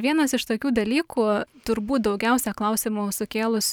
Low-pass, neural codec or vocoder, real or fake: 19.8 kHz; vocoder, 44.1 kHz, 128 mel bands every 512 samples, BigVGAN v2; fake